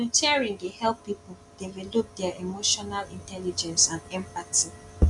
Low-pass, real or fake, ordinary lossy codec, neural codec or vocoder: 10.8 kHz; real; none; none